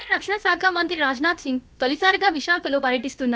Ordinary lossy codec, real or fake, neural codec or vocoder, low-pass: none; fake; codec, 16 kHz, about 1 kbps, DyCAST, with the encoder's durations; none